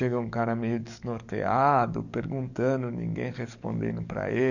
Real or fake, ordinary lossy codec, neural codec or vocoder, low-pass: fake; none; codec, 44.1 kHz, 7.8 kbps, DAC; 7.2 kHz